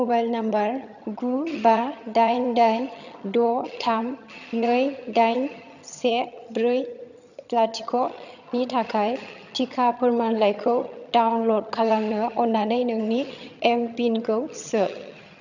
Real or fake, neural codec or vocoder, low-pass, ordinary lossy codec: fake; vocoder, 22.05 kHz, 80 mel bands, HiFi-GAN; 7.2 kHz; none